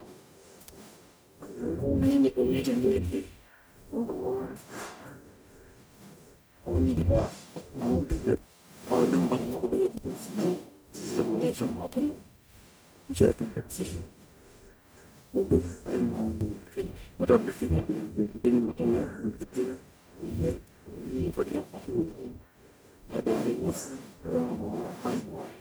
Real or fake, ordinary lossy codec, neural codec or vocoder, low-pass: fake; none; codec, 44.1 kHz, 0.9 kbps, DAC; none